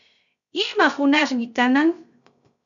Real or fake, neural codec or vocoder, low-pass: fake; codec, 16 kHz, 0.3 kbps, FocalCodec; 7.2 kHz